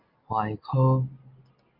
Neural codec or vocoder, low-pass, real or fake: none; 5.4 kHz; real